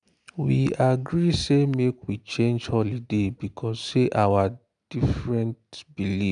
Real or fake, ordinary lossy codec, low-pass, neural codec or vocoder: real; none; 9.9 kHz; none